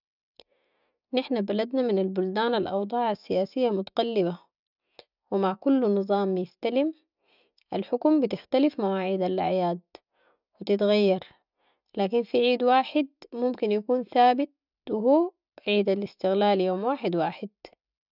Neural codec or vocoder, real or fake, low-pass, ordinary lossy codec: none; real; 5.4 kHz; none